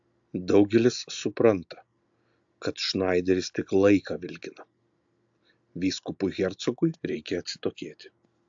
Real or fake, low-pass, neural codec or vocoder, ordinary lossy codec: real; 7.2 kHz; none; AAC, 64 kbps